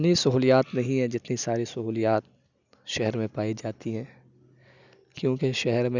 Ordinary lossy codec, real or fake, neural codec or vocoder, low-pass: none; real; none; 7.2 kHz